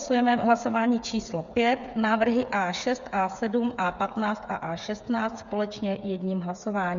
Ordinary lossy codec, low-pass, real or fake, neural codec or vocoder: Opus, 64 kbps; 7.2 kHz; fake; codec, 16 kHz, 8 kbps, FreqCodec, smaller model